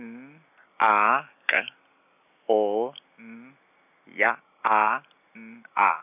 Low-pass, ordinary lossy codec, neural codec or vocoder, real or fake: 3.6 kHz; none; none; real